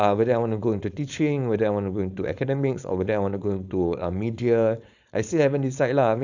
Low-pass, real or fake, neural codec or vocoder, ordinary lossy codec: 7.2 kHz; fake; codec, 16 kHz, 4.8 kbps, FACodec; none